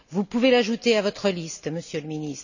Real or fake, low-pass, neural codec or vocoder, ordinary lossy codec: real; 7.2 kHz; none; none